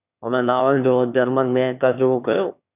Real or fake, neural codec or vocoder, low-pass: fake; autoencoder, 22.05 kHz, a latent of 192 numbers a frame, VITS, trained on one speaker; 3.6 kHz